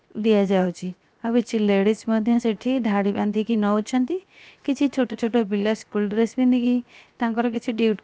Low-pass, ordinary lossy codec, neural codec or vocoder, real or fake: none; none; codec, 16 kHz, 0.7 kbps, FocalCodec; fake